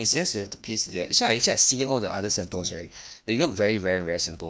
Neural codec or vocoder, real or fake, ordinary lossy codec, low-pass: codec, 16 kHz, 1 kbps, FreqCodec, larger model; fake; none; none